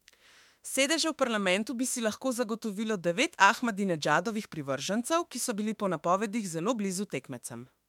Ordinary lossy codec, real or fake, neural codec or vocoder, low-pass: MP3, 96 kbps; fake; autoencoder, 48 kHz, 32 numbers a frame, DAC-VAE, trained on Japanese speech; 19.8 kHz